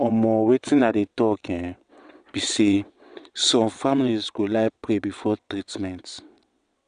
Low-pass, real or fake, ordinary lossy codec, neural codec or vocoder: 9.9 kHz; fake; Opus, 64 kbps; vocoder, 22.05 kHz, 80 mel bands, WaveNeXt